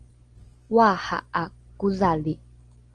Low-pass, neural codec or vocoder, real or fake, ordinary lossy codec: 9.9 kHz; none; real; Opus, 24 kbps